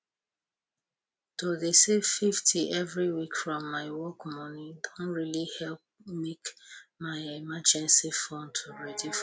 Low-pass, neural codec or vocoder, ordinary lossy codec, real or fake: none; none; none; real